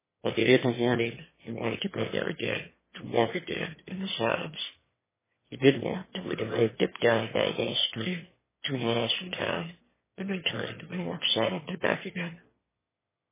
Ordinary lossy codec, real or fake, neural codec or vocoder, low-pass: MP3, 16 kbps; fake; autoencoder, 22.05 kHz, a latent of 192 numbers a frame, VITS, trained on one speaker; 3.6 kHz